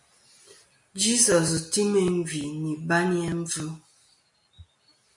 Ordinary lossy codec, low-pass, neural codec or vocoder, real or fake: MP3, 48 kbps; 10.8 kHz; none; real